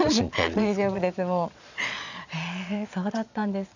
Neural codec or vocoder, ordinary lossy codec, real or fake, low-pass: vocoder, 22.05 kHz, 80 mel bands, Vocos; none; fake; 7.2 kHz